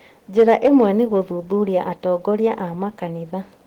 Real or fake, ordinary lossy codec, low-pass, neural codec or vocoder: real; Opus, 16 kbps; 19.8 kHz; none